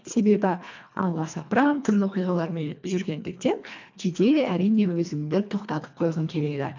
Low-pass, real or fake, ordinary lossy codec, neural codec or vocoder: 7.2 kHz; fake; MP3, 64 kbps; codec, 24 kHz, 1.5 kbps, HILCodec